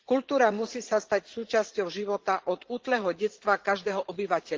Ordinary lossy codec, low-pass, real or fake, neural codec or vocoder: Opus, 16 kbps; 7.2 kHz; fake; vocoder, 44.1 kHz, 80 mel bands, Vocos